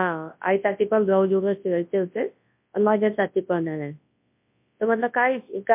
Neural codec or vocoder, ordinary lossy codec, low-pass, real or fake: codec, 24 kHz, 0.9 kbps, WavTokenizer, large speech release; MP3, 32 kbps; 3.6 kHz; fake